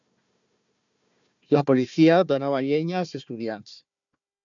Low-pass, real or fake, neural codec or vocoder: 7.2 kHz; fake; codec, 16 kHz, 1 kbps, FunCodec, trained on Chinese and English, 50 frames a second